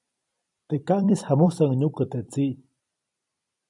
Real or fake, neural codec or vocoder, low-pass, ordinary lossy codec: fake; vocoder, 44.1 kHz, 128 mel bands every 256 samples, BigVGAN v2; 10.8 kHz; MP3, 96 kbps